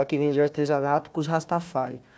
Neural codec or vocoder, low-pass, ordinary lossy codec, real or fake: codec, 16 kHz, 1 kbps, FunCodec, trained on Chinese and English, 50 frames a second; none; none; fake